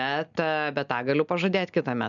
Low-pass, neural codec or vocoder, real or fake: 7.2 kHz; none; real